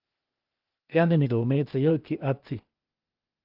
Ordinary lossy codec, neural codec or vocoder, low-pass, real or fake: Opus, 32 kbps; codec, 16 kHz, 0.8 kbps, ZipCodec; 5.4 kHz; fake